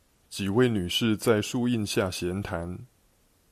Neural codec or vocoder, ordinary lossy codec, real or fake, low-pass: none; MP3, 96 kbps; real; 14.4 kHz